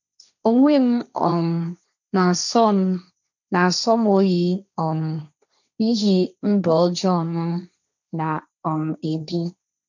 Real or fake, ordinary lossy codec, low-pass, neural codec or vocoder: fake; none; 7.2 kHz; codec, 16 kHz, 1.1 kbps, Voila-Tokenizer